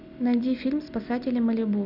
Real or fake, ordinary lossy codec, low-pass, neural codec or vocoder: real; none; 5.4 kHz; none